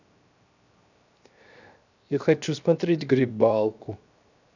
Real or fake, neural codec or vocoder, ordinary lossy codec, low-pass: fake; codec, 16 kHz, 0.7 kbps, FocalCodec; none; 7.2 kHz